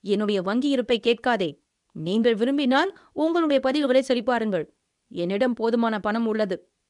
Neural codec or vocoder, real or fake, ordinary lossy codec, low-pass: codec, 24 kHz, 0.9 kbps, WavTokenizer, medium speech release version 2; fake; none; 10.8 kHz